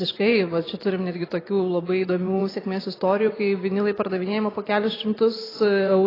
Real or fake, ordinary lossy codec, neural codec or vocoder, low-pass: fake; AAC, 24 kbps; vocoder, 44.1 kHz, 128 mel bands every 512 samples, BigVGAN v2; 5.4 kHz